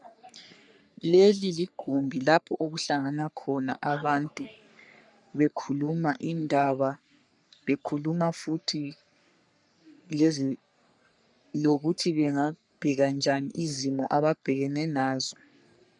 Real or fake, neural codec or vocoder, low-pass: fake; codec, 44.1 kHz, 3.4 kbps, Pupu-Codec; 10.8 kHz